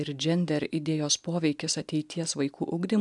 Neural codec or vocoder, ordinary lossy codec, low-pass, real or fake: none; MP3, 96 kbps; 10.8 kHz; real